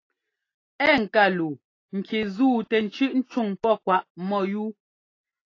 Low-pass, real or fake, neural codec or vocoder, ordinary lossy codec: 7.2 kHz; real; none; AAC, 32 kbps